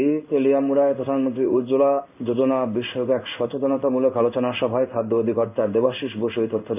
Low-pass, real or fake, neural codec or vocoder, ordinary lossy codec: 3.6 kHz; fake; codec, 16 kHz in and 24 kHz out, 1 kbps, XY-Tokenizer; none